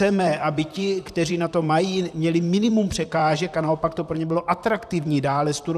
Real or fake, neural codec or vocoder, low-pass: fake; vocoder, 44.1 kHz, 128 mel bands, Pupu-Vocoder; 14.4 kHz